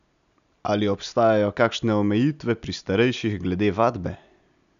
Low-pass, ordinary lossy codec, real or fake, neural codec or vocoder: 7.2 kHz; none; real; none